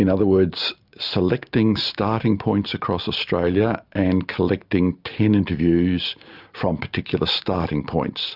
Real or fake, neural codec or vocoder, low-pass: real; none; 5.4 kHz